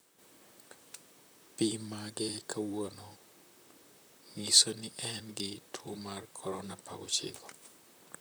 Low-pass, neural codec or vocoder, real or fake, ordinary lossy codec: none; vocoder, 44.1 kHz, 128 mel bands, Pupu-Vocoder; fake; none